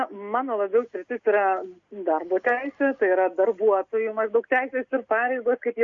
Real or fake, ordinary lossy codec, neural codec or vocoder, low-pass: real; Opus, 64 kbps; none; 7.2 kHz